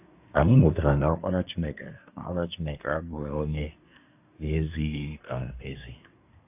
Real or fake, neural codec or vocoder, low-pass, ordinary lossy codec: fake; codec, 24 kHz, 1 kbps, SNAC; 3.6 kHz; AAC, 32 kbps